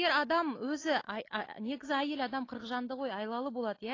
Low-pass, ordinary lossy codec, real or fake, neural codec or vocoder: 7.2 kHz; AAC, 32 kbps; real; none